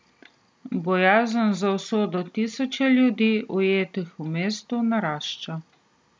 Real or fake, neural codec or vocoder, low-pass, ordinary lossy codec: real; none; none; none